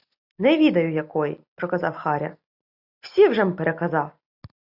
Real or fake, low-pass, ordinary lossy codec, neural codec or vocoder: real; 5.4 kHz; AAC, 48 kbps; none